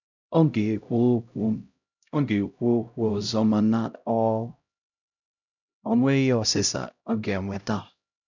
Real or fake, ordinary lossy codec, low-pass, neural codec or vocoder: fake; none; 7.2 kHz; codec, 16 kHz, 0.5 kbps, X-Codec, HuBERT features, trained on LibriSpeech